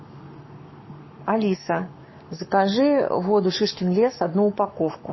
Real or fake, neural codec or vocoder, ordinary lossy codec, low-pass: fake; codec, 44.1 kHz, 7.8 kbps, Pupu-Codec; MP3, 24 kbps; 7.2 kHz